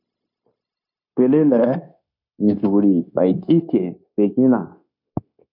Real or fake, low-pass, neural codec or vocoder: fake; 5.4 kHz; codec, 16 kHz, 0.9 kbps, LongCat-Audio-Codec